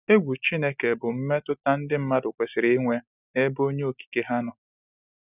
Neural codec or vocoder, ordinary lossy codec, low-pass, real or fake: none; none; 3.6 kHz; real